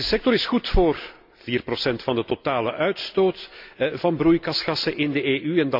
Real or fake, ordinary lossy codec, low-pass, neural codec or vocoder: real; MP3, 48 kbps; 5.4 kHz; none